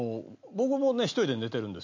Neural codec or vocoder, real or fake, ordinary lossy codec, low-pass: none; real; MP3, 64 kbps; 7.2 kHz